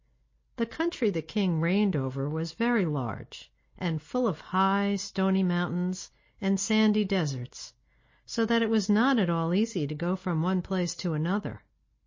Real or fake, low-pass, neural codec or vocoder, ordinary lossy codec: real; 7.2 kHz; none; MP3, 32 kbps